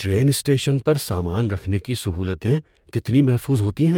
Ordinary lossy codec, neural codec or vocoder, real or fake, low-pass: MP3, 96 kbps; codec, 44.1 kHz, 2.6 kbps, DAC; fake; 19.8 kHz